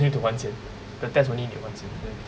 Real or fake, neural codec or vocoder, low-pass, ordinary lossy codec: real; none; none; none